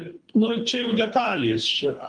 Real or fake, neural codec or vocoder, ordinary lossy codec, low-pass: fake; codec, 24 kHz, 3 kbps, HILCodec; Opus, 32 kbps; 9.9 kHz